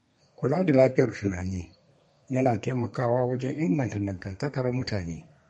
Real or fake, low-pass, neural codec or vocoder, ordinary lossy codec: fake; 14.4 kHz; codec, 32 kHz, 1.9 kbps, SNAC; MP3, 48 kbps